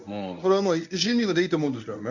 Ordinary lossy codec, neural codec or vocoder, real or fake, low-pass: none; codec, 24 kHz, 0.9 kbps, WavTokenizer, medium speech release version 1; fake; 7.2 kHz